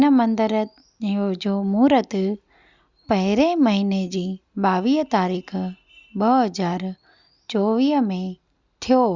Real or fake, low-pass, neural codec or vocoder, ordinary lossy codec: real; 7.2 kHz; none; Opus, 64 kbps